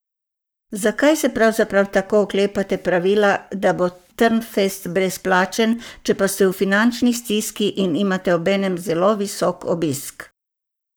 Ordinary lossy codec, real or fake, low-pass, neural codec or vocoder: none; fake; none; codec, 44.1 kHz, 7.8 kbps, Pupu-Codec